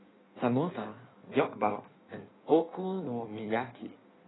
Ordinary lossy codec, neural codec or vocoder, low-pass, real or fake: AAC, 16 kbps; codec, 16 kHz in and 24 kHz out, 1.1 kbps, FireRedTTS-2 codec; 7.2 kHz; fake